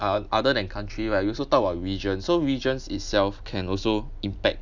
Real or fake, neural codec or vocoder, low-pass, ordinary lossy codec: real; none; 7.2 kHz; none